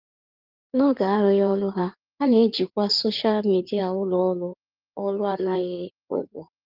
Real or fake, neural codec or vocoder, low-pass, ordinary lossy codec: fake; codec, 16 kHz in and 24 kHz out, 2.2 kbps, FireRedTTS-2 codec; 5.4 kHz; Opus, 32 kbps